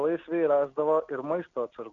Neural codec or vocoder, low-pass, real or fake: none; 7.2 kHz; real